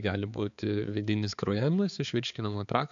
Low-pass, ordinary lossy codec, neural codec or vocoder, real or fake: 7.2 kHz; AAC, 64 kbps; codec, 16 kHz, 4 kbps, X-Codec, HuBERT features, trained on balanced general audio; fake